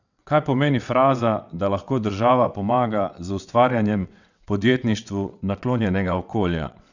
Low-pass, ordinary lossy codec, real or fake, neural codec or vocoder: 7.2 kHz; none; fake; vocoder, 22.05 kHz, 80 mel bands, WaveNeXt